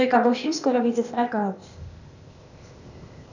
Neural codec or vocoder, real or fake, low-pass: codec, 16 kHz, 0.8 kbps, ZipCodec; fake; 7.2 kHz